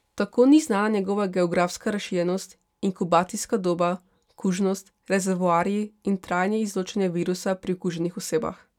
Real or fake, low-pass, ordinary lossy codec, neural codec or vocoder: real; 19.8 kHz; none; none